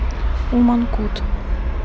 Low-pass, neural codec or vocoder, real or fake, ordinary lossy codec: none; none; real; none